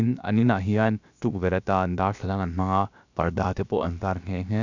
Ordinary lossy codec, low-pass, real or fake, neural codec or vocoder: none; 7.2 kHz; fake; codec, 16 kHz, about 1 kbps, DyCAST, with the encoder's durations